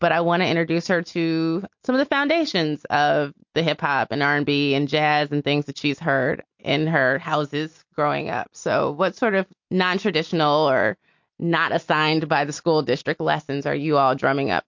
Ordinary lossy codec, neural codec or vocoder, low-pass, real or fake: MP3, 48 kbps; none; 7.2 kHz; real